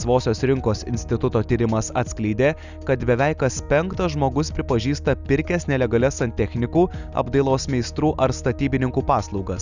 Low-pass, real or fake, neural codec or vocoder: 7.2 kHz; real; none